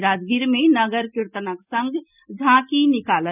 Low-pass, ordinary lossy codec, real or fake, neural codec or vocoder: 3.6 kHz; none; real; none